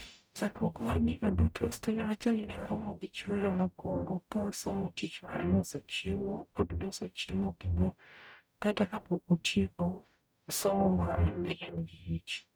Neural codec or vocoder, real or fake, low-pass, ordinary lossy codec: codec, 44.1 kHz, 0.9 kbps, DAC; fake; none; none